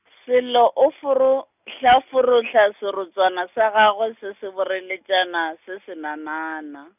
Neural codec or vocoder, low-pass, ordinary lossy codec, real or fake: none; 3.6 kHz; none; real